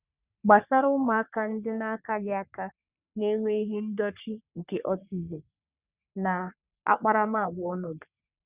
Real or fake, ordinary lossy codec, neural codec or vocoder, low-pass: fake; Opus, 64 kbps; codec, 44.1 kHz, 3.4 kbps, Pupu-Codec; 3.6 kHz